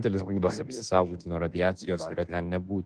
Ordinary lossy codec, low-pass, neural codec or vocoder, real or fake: Opus, 16 kbps; 10.8 kHz; codec, 24 kHz, 1.2 kbps, DualCodec; fake